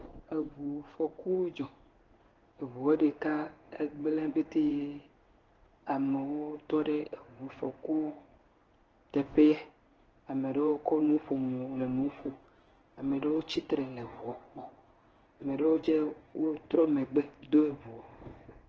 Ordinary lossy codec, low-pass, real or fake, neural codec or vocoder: Opus, 16 kbps; 7.2 kHz; fake; codec, 16 kHz in and 24 kHz out, 1 kbps, XY-Tokenizer